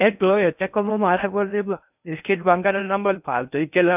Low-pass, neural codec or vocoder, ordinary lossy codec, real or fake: 3.6 kHz; codec, 16 kHz in and 24 kHz out, 0.6 kbps, FocalCodec, streaming, 4096 codes; none; fake